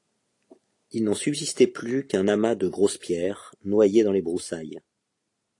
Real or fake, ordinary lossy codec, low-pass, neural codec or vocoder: real; AAC, 48 kbps; 10.8 kHz; none